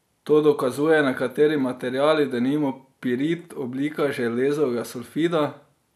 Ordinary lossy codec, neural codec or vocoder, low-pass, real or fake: none; none; 14.4 kHz; real